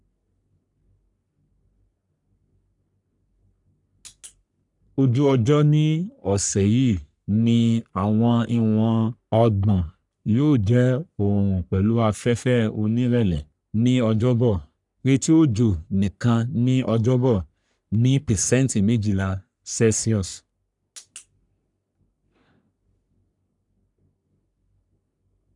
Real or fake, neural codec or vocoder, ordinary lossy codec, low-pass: fake; codec, 44.1 kHz, 3.4 kbps, Pupu-Codec; none; 10.8 kHz